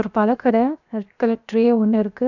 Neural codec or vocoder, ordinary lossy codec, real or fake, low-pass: codec, 16 kHz, 0.7 kbps, FocalCodec; none; fake; 7.2 kHz